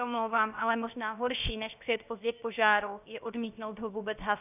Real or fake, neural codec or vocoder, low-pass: fake; codec, 16 kHz, 0.7 kbps, FocalCodec; 3.6 kHz